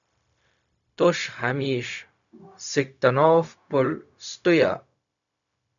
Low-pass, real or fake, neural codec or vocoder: 7.2 kHz; fake; codec, 16 kHz, 0.4 kbps, LongCat-Audio-Codec